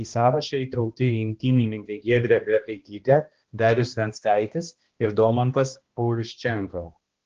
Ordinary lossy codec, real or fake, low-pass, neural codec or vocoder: Opus, 16 kbps; fake; 7.2 kHz; codec, 16 kHz, 1 kbps, X-Codec, HuBERT features, trained on balanced general audio